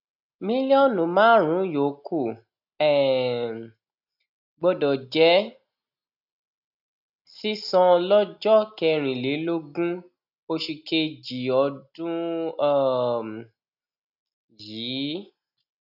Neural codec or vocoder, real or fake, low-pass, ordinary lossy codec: none; real; 5.4 kHz; AAC, 48 kbps